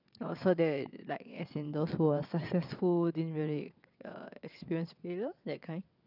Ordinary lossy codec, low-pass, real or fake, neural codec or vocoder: none; 5.4 kHz; fake; vocoder, 22.05 kHz, 80 mel bands, WaveNeXt